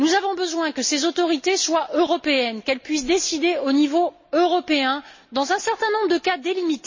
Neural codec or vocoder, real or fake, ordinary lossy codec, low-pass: none; real; MP3, 32 kbps; 7.2 kHz